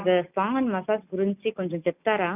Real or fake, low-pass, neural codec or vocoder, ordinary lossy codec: real; 3.6 kHz; none; none